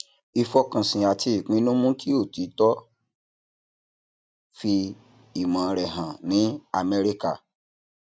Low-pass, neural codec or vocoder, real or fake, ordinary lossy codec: none; none; real; none